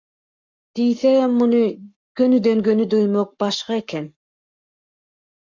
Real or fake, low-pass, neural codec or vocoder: fake; 7.2 kHz; codec, 44.1 kHz, 7.8 kbps, Pupu-Codec